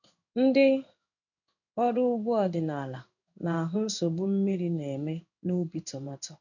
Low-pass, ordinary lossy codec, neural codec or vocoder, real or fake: 7.2 kHz; none; codec, 16 kHz in and 24 kHz out, 1 kbps, XY-Tokenizer; fake